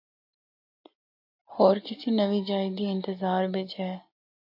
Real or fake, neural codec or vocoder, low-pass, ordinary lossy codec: real; none; 5.4 kHz; MP3, 32 kbps